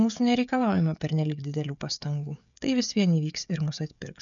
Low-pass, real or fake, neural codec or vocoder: 7.2 kHz; fake; codec, 16 kHz, 16 kbps, FreqCodec, smaller model